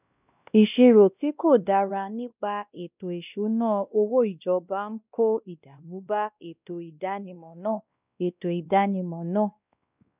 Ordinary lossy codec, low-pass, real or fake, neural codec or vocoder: none; 3.6 kHz; fake; codec, 16 kHz, 1 kbps, X-Codec, WavLM features, trained on Multilingual LibriSpeech